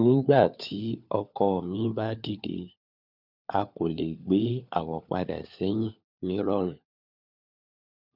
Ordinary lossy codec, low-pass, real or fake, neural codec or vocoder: none; 5.4 kHz; fake; codec, 16 kHz, 4 kbps, FunCodec, trained on LibriTTS, 50 frames a second